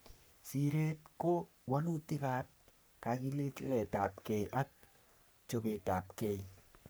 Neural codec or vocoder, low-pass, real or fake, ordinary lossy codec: codec, 44.1 kHz, 3.4 kbps, Pupu-Codec; none; fake; none